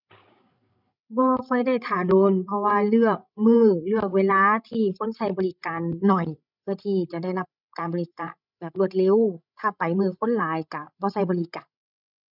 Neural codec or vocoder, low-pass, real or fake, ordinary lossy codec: codec, 16 kHz, 16 kbps, FreqCodec, larger model; 5.4 kHz; fake; none